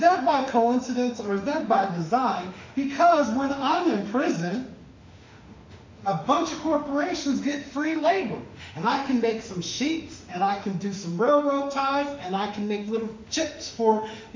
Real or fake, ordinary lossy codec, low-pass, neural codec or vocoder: fake; MP3, 64 kbps; 7.2 kHz; autoencoder, 48 kHz, 32 numbers a frame, DAC-VAE, trained on Japanese speech